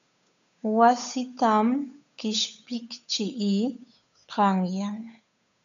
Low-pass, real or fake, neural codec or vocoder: 7.2 kHz; fake; codec, 16 kHz, 8 kbps, FunCodec, trained on Chinese and English, 25 frames a second